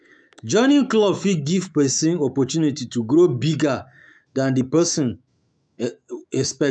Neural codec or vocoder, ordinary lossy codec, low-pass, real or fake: autoencoder, 48 kHz, 128 numbers a frame, DAC-VAE, trained on Japanese speech; none; 9.9 kHz; fake